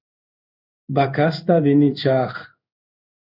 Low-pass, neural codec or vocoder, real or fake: 5.4 kHz; codec, 16 kHz in and 24 kHz out, 1 kbps, XY-Tokenizer; fake